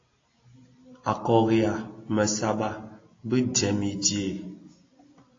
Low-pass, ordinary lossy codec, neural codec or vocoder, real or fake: 7.2 kHz; AAC, 32 kbps; none; real